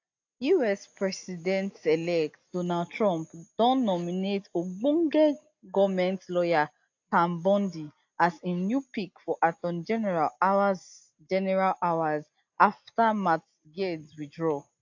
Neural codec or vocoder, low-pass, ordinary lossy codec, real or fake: none; 7.2 kHz; AAC, 48 kbps; real